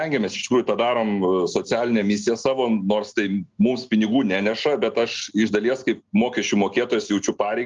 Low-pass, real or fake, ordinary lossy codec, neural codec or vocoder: 7.2 kHz; real; Opus, 24 kbps; none